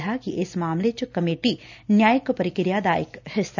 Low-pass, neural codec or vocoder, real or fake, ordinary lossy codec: 7.2 kHz; none; real; none